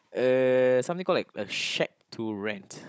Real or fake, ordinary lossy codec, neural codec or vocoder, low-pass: fake; none; codec, 16 kHz, 16 kbps, FunCodec, trained on Chinese and English, 50 frames a second; none